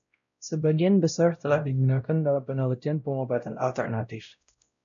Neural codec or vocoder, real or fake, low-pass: codec, 16 kHz, 0.5 kbps, X-Codec, WavLM features, trained on Multilingual LibriSpeech; fake; 7.2 kHz